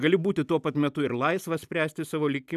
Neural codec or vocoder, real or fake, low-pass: none; real; 14.4 kHz